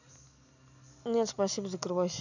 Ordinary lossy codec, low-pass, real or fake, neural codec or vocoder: none; 7.2 kHz; real; none